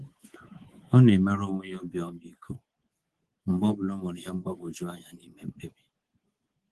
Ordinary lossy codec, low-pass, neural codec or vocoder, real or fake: Opus, 16 kbps; 10.8 kHz; codec, 24 kHz, 3.1 kbps, DualCodec; fake